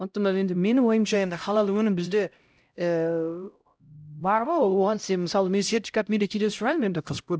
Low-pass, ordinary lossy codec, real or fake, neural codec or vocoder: none; none; fake; codec, 16 kHz, 0.5 kbps, X-Codec, HuBERT features, trained on LibriSpeech